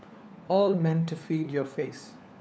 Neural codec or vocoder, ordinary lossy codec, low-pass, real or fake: codec, 16 kHz, 4 kbps, FunCodec, trained on LibriTTS, 50 frames a second; none; none; fake